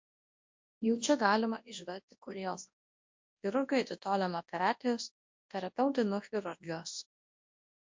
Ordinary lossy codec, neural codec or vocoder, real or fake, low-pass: MP3, 48 kbps; codec, 24 kHz, 0.9 kbps, WavTokenizer, large speech release; fake; 7.2 kHz